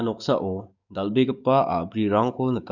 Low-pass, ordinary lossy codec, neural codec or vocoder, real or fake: 7.2 kHz; none; codec, 44.1 kHz, 7.8 kbps, DAC; fake